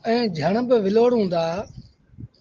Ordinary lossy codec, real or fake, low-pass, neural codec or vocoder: Opus, 16 kbps; real; 7.2 kHz; none